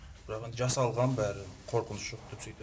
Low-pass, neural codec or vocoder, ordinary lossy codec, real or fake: none; none; none; real